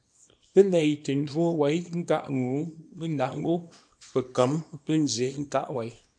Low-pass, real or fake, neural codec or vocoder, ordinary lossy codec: 9.9 kHz; fake; codec, 24 kHz, 0.9 kbps, WavTokenizer, small release; MP3, 64 kbps